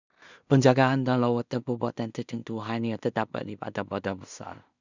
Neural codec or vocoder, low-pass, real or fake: codec, 16 kHz in and 24 kHz out, 0.4 kbps, LongCat-Audio-Codec, two codebook decoder; 7.2 kHz; fake